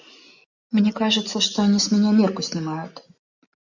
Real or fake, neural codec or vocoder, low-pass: real; none; 7.2 kHz